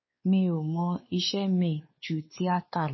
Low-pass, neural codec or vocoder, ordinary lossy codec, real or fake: 7.2 kHz; codec, 16 kHz, 4 kbps, X-Codec, WavLM features, trained on Multilingual LibriSpeech; MP3, 24 kbps; fake